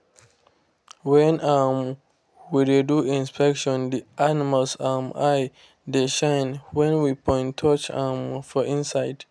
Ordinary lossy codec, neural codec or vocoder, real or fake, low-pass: none; none; real; none